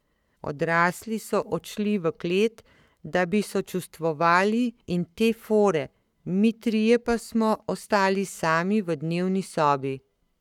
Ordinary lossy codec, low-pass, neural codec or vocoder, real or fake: none; 19.8 kHz; codec, 44.1 kHz, 7.8 kbps, Pupu-Codec; fake